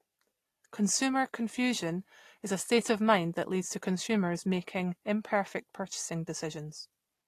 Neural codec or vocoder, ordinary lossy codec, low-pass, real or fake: none; AAC, 48 kbps; 14.4 kHz; real